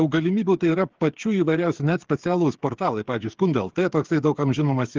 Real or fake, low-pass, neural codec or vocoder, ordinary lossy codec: fake; 7.2 kHz; codec, 16 kHz, 8 kbps, FreqCodec, smaller model; Opus, 16 kbps